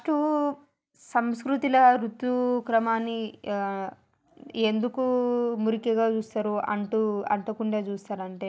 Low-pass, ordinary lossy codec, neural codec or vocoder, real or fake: none; none; none; real